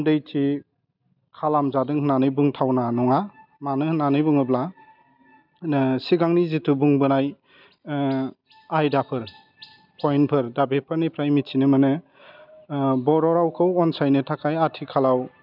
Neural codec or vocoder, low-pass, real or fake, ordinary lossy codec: none; 5.4 kHz; real; none